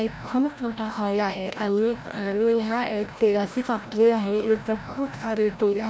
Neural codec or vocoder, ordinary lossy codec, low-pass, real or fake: codec, 16 kHz, 0.5 kbps, FreqCodec, larger model; none; none; fake